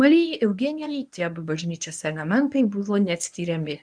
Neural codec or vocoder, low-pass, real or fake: codec, 24 kHz, 0.9 kbps, WavTokenizer, medium speech release version 1; 9.9 kHz; fake